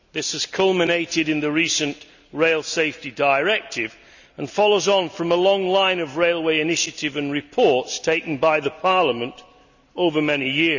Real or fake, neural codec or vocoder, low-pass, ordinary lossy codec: real; none; 7.2 kHz; none